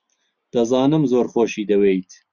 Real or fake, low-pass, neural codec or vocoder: real; 7.2 kHz; none